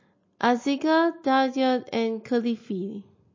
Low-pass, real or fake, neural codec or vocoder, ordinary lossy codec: 7.2 kHz; real; none; MP3, 32 kbps